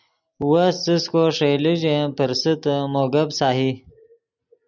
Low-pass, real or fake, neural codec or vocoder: 7.2 kHz; real; none